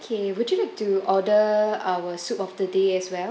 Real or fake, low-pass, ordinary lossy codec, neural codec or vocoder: real; none; none; none